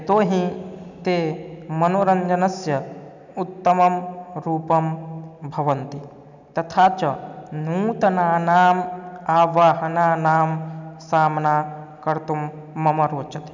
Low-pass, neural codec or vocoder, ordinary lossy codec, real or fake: 7.2 kHz; none; none; real